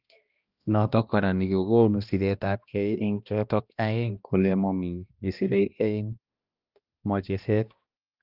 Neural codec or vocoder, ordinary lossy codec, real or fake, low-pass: codec, 16 kHz, 1 kbps, X-Codec, HuBERT features, trained on balanced general audio; Opus, 32 kbps; fake; 5.4 kHz